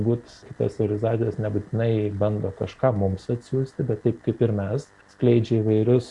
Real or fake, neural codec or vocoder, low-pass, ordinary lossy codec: real; none; 10.8 kHz; Opus, 64 kbps